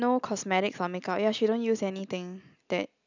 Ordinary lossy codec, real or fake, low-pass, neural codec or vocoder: none; real; 7.2 kHz; none